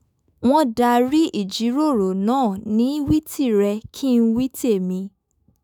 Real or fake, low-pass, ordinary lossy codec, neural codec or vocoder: fake; none; none; autoencoder, 48 kHz, 128 numbers a frame, DAC-VAE, trained on Japanese speech